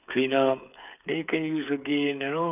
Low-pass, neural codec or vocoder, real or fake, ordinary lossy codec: 3.6 kHz; codec, 16 kHz, 8 kbps, FreqCodec, smaller model; fake; none